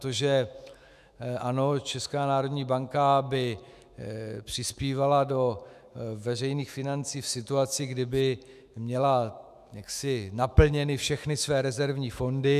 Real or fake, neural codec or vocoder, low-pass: fake; autoencoder, 48 kHz, 128 numbers a frame, DAC-VAE, trained on Japanese speech; 14.4 kHz